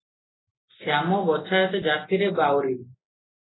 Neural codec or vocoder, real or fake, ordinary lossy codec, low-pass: none; real; AAC, 16 kbps; 7.2 kHz